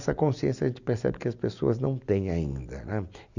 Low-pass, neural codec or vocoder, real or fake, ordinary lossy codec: 7.2 kHz; none; real; none